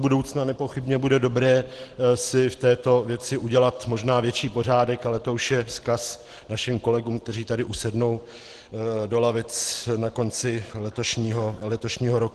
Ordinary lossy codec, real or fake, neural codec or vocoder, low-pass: Opus, 16 kbps; real; none; 10.8 kHz